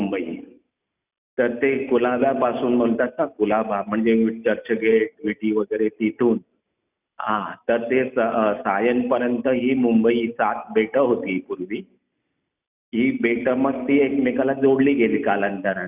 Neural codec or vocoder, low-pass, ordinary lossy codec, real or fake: none; 3.6 kHz; none; real